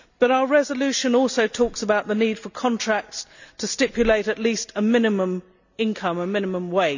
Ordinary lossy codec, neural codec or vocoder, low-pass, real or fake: none; none; 7.2 kHz; real